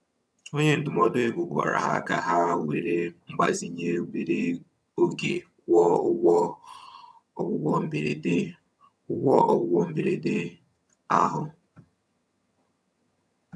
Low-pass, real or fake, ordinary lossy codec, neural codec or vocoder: none; fake; none; vocoder, 22.05 kHz, 80 mel bands, HiFi-GAN